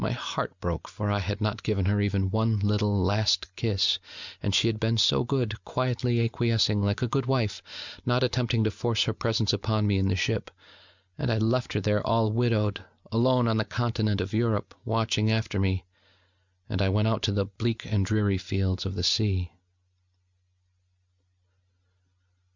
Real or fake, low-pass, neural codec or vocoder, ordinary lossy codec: real; 7.2 kHz; none; Opus, 64 kbps